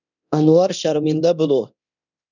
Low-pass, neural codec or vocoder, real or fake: 7.2 kHz; codec, 24 kHz, 0.9 kbps, DualCodec; fake